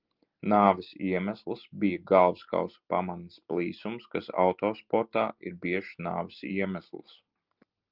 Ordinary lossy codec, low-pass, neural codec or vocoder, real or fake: Opus, 32 kbps; 5.4 kHz; none; real